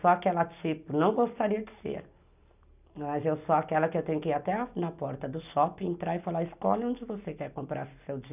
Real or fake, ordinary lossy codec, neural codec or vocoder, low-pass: real; none; none; 3.6 kHz